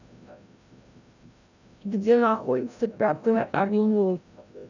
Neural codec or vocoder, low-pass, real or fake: codec, 16 kHz, 0.5 kbps, FreqCodec, larger model; 7.2 kHz; fake